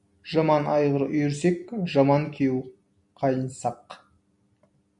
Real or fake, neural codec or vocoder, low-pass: real; none; 10.8 kHz